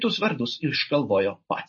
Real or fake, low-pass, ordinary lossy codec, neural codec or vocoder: real; 7.2 kHz; MP3, 32 kbps; none